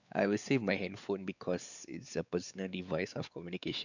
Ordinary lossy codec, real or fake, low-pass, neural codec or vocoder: none; fake; 7.2 kHz; codec, 16 kHz, 4 kbps, X-Codec, WavLM features, trained on Multilingual LibriSpeech